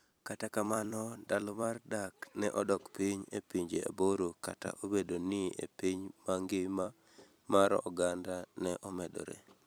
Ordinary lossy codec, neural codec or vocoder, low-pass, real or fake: none; vocoder, 44.1 kHz, 128 mel bands every 256 samples, BigVGAN v2; none; fake